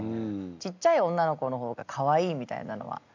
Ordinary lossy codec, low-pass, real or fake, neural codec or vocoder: MP3, 64 kbps; 7.2 kHz; real; none